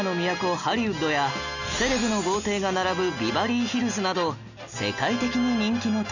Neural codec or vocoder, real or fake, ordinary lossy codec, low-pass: none; real; none; 7.2 kHz